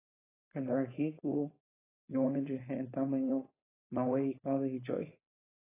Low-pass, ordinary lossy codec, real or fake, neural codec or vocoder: 3.6 kHz; AAC, 16 kbps; fake; codec, 16 kHz, 4.8 kbps, FACodec